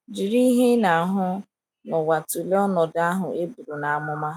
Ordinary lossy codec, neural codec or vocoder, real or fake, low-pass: none; none; real; 19.8 kHz